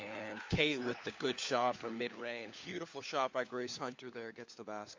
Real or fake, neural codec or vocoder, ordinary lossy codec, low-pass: fake; codec, 16 kHz, 4 kbps, FunCodec, trained on LibriTTS, 50 frames a second; MP3, 48 kbps; 7.2 kHz